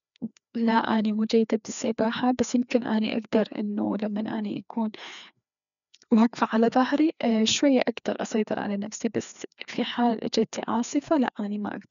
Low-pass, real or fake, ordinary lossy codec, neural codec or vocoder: 7.2 kHz; fake; none; codec, 16 kHz, 2 kbps, FreqCodec, larger model